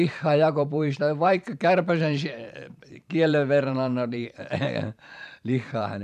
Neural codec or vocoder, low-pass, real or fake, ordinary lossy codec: vocoder, 44.1 kHz, 128 mel bands every 512 samples, BigVGAN v2; 14.4 kHz; fake; none